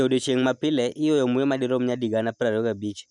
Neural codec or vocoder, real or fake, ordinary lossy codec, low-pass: none; real; none; 10.8 kHz